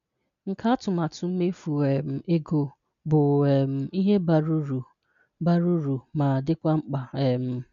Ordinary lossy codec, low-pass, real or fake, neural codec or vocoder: none; 7.2 kHz; real; none